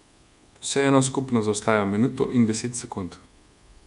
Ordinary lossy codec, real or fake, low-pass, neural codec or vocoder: none; fake; 10.8 kHz; codec, 24 kHz, 1.2 kbps, DualCodec